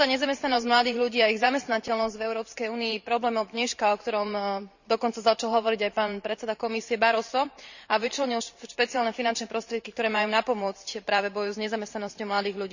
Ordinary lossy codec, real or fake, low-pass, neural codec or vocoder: none; fake; 7.2 kHz; vocoder, 44.1 kHz, 128 mel bands every 512 samples, BigVGAN v2